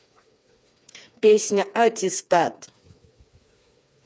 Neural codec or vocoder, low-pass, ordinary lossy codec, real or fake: codec, 16 kHz, 4 kbps, FreqCodec, smaller model; none; none; fake